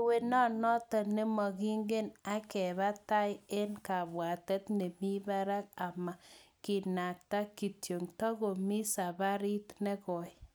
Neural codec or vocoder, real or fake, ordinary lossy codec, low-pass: none; real; none; none